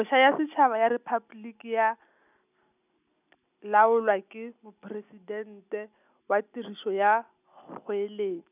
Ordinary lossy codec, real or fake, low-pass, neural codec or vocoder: none; real; 3.6 kHz; none